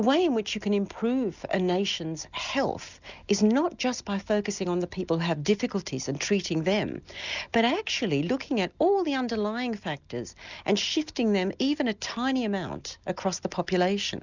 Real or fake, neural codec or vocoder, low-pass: real; none; 7.2 kHz